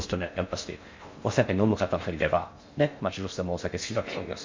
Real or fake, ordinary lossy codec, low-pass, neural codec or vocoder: fake; MP3, 48 kbps; 7.2 kHz; codec, 16 kHz in and 24 kHz out, 0.6 kbps, FocalCodec, streaming, 4096 codes